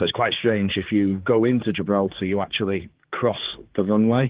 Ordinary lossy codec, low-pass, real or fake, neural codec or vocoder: Opus, 16 kbps; 3.6 kHz; fake; codec, 16 kHz, 4 kbps, X-Codec, HuBERT features, trained on general audio